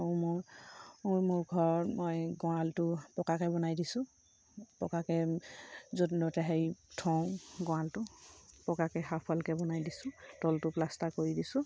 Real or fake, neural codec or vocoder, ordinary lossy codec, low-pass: real; none; none; none